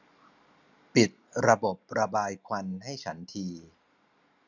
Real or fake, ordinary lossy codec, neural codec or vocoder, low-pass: real; none; none; 7.2 kHz